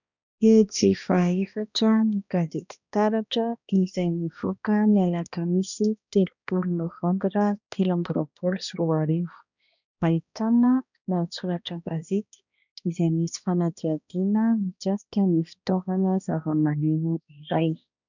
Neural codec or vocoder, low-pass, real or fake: codec, 16 kHz, 1 kbps, X-Codec, HuBERT features, trained on balanced general audio; 7.2 kHz; fake